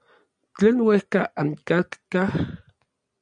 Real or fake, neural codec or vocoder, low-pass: real; none; 9.9 kHz